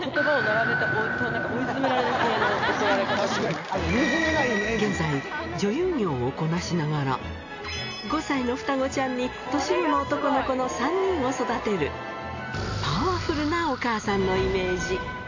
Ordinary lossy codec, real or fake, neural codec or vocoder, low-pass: AAC, 48 kbps; real; none; 7.2 kHz